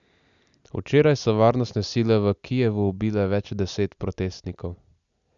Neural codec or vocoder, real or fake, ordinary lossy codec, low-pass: none; real; none; 7.2 kHz